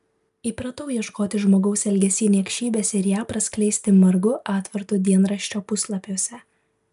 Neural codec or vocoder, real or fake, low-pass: none; real; 14.4 kHz